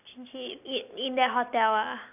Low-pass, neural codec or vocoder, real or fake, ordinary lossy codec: 3.6 kHz; none; real; none